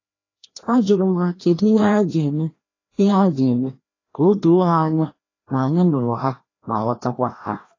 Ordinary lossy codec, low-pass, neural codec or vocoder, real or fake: AAC, 32 kbps; 7.2 kHz; codec, 16 kHz, 1 kbps, FreqCodec, larger model; fake